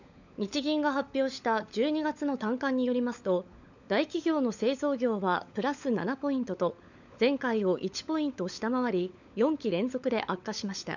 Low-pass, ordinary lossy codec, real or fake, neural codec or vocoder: 7.2 kHz; none; fake; codec, 16 kHz, 16 kbps, FunCodec, trained on LibriTTS, 50 frames a second